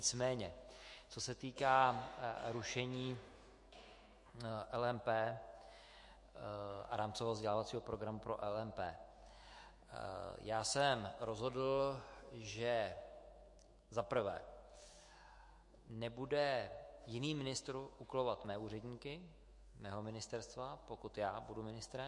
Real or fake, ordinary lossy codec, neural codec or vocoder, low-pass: fake; MP3, 48 kbps; autoencoder, 48 kHz, 128 numbers a frame, DAC-VAE, trained on Japanese speech; 10.8 kHz